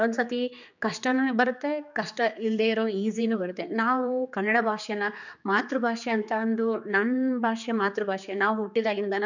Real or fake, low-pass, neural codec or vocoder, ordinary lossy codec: fake; 7.2 kHz; codec, 16 kHz, 4 kbps, X-Codec, HuBERT features, trained on general audio; none